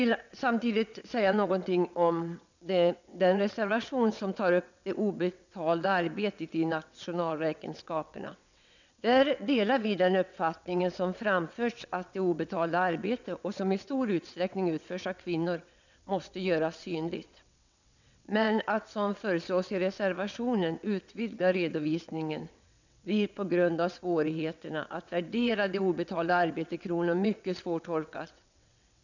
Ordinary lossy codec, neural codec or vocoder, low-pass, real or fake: none; vocoder, 22.05 kHz, 80 mel bands, WaveNeXt; 7.2 kHz; fake